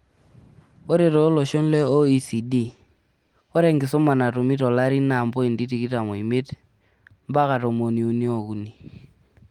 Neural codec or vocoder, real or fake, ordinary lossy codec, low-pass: none; real; Opus, 24 kbps; 19.8 kHz